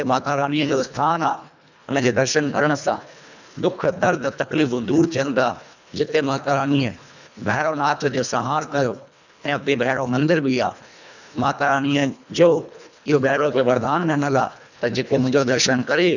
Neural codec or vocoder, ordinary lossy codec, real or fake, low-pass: codec, 24 kHz, 1.5 kbps, HILCodec; none; fake; 7.2 kHz